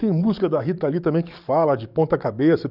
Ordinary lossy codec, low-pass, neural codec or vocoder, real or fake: none; 5.4 kHz; codec, 44.1 kHz, 7.8 kbps, DAC; fake